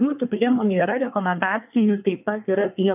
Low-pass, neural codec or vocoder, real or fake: 3.6 kHz; codec, 24 kHz, 1 kbps, SNAC; fake